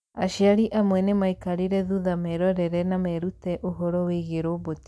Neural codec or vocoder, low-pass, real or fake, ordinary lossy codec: none; none; real; none